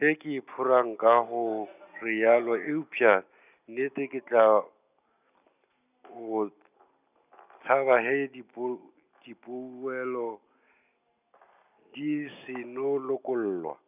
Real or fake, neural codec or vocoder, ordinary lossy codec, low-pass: real; none; none; 3.6 kHz